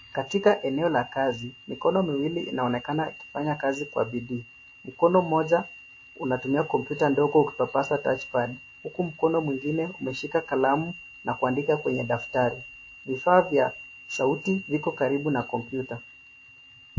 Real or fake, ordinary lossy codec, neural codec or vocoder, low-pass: real; MP3, 32 kbps; none; 7.2 kHz